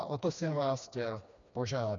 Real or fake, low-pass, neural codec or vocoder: fake; 7.2 kHz; codec, 16 kHz, 2 kbps, FreqCodec, smaller model